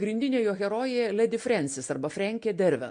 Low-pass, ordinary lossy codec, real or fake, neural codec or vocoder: 9.9 kHz; MP3, 48 kbps; real; none